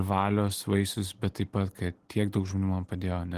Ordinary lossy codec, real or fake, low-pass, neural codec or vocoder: Opus, 16 kbps; real; 14.4 kHz; none